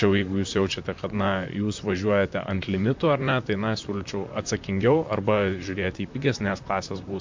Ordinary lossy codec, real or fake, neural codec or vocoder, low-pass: MP3, 48 kbps; fake; vocoder, 44.1 kHz, 128 mel bands, Pupu-Vocoder; 7.2 kHz